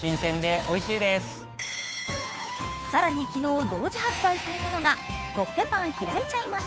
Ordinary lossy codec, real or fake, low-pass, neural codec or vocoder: none; fake; none; codec, 16 kHz, 2 kbps, FunCodec, trained on Chinese and English, 25 frames a second